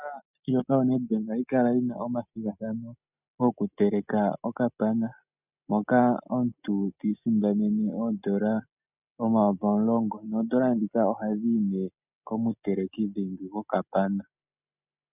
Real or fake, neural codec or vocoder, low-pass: real; none; 3.6 kHz